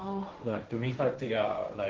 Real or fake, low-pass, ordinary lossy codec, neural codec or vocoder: fake; 7.2 kHz; Opus, 16 kbps; codec, 16 kHz, 1.1 kbps, Voila-Tokenizer